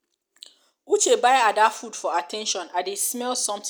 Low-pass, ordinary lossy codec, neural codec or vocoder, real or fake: none; none; none; real